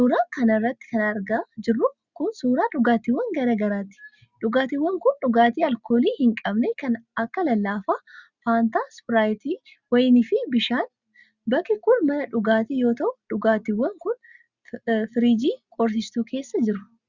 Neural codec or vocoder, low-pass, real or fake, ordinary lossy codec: none; 7.2 kHz; real; Opus, 64 kbps